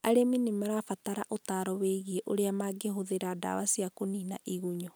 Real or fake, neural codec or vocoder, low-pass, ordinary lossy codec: real; none; none; none